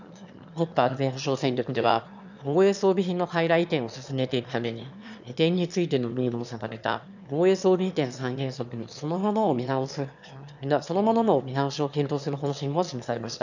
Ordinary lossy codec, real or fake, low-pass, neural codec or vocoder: none; fake; 7.2 kHz; autoencoder, 22.05 kHz, a latent of 192 numbers a frame, VITS, trained on one speaker